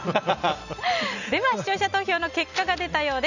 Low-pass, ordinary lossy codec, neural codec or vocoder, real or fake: 7.2 kHz; none; none; real